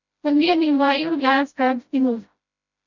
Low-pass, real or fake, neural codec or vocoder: 7.2 kHz; fake; codec, 16 kHz, 0.5 kbps, FreqCodec, smaller model